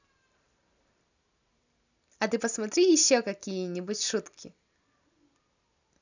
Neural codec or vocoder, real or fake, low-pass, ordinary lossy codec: none; real; 7.2 kHz; none